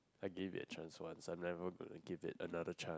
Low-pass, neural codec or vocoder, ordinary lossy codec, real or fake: none; none; none; real